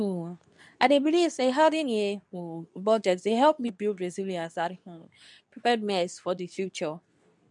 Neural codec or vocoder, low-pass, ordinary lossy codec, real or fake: codec, 24 kHz, 0.9 kbps, WavTokenizer, medium speech release version 2; 10.8 kHz; none; fake